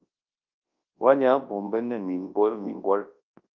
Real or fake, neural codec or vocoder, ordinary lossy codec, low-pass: fake; codec, 24 kHz, 0.9 kbps, WavTokenizer, large speech release; Opus, 32 kbps; 7.2 kHz